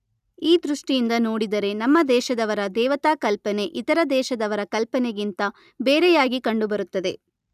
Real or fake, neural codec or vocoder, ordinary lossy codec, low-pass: real; none; none; 14.4 kHz